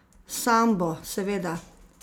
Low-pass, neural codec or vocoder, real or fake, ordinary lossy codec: none; none; real; none